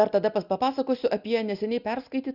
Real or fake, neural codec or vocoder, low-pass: real; none; 5.4 kHz